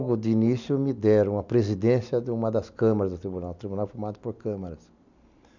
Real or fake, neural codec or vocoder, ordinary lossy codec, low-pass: real; none; none; 7.2 kHz